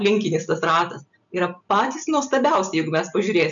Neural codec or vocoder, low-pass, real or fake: none; 7.2 kHz; real